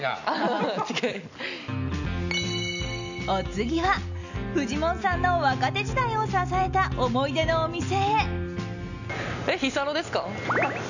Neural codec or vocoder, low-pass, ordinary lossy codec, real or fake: none; 7.2 kHz; none; real